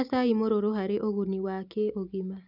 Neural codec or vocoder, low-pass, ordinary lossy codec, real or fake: none; 5.4 kHz; none; real